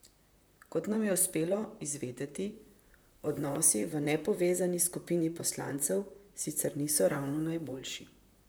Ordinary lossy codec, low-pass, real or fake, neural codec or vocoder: none; none; fake; vocoder, 44.1 kHz, 128 mel bands, Pupu-Vocoder